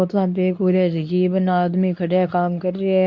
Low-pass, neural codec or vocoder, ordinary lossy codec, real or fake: 7.2 kHz; codec, 24 kHz, 0.9 kbps, WavTokenizer, medium speech release version 2; none; fake